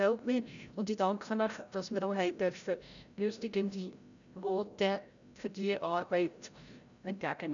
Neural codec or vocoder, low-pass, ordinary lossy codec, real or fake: codec, 16 kHz, 0.5 kbps, FreqCodec, larger model; 7.2 kHz; none; fake